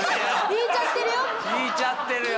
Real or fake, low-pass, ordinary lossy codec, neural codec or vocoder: real; none; none; none